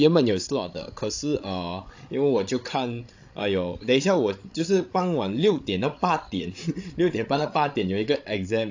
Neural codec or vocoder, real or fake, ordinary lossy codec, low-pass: codec, 16 kHz, 16 kbps, FreqCodec, larger model; fake; AAC, 48 kbps; 7.2 kHz